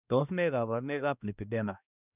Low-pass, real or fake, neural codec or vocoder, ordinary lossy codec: 3.6 kHz; fake; codec, 16 kHz, 1 kbps, X-Codec, HuBERT features, trained on balanced general audio; none